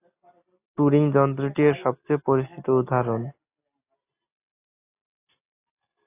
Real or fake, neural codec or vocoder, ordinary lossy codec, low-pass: real; none; AAC, 24 kbps; 3.6 kHz